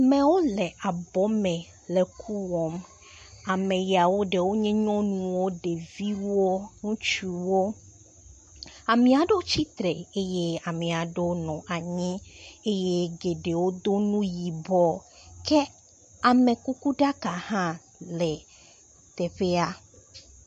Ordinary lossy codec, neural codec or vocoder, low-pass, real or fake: MP3, 48 kbps; none; 14.4 kHz; real